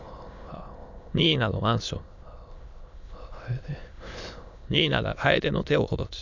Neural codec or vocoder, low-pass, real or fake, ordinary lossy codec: autoencoder, 22.05 kHz, a latent of 192 numbers a frame, VITS, trained on many speakers; 7.2 kHz; fake; none